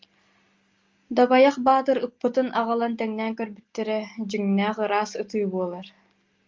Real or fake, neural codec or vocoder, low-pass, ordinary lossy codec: real; none; 7.2 kHz; Opus, 32 kbps